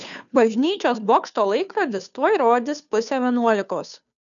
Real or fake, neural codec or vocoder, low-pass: fake; codec, 16 kHz, 2 kbps, FunCodec, trained on Chinese and English, 25 frames a second; 7.2 kHz